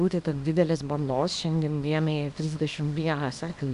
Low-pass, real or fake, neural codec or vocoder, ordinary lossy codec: 10.8 kHz; fake; codec, 24 kHz, 0.9 kbps, WavTokenizer, small release; AAC, 96 kbps